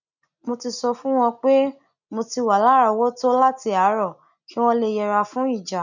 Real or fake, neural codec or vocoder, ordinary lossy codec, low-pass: real; none; none; 7.2 kHz